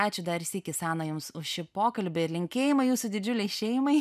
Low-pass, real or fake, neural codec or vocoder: 14.4 kHz; real; none